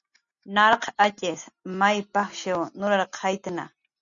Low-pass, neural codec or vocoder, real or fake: 7.2 kHz; none; real